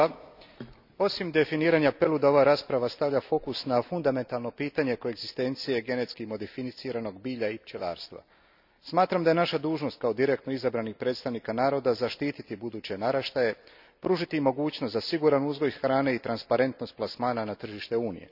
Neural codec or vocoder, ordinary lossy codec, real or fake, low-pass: none; none; real; 5.4 kHz